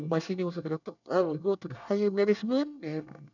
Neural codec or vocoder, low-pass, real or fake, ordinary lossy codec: codec, 24 kHz, 1 kbps, SNAC; 7.2 kHz; fake; none